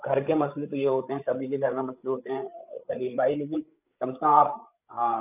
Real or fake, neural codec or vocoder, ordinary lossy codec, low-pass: fake; codec, 16 kHz, 8 kbps, FreqCodec, larger model; none; 3.6 kHz